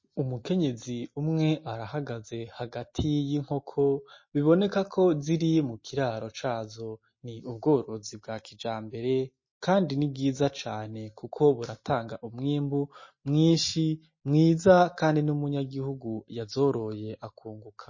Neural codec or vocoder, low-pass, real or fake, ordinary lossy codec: none; 7.2 kHz; real; MP3, 32 kbps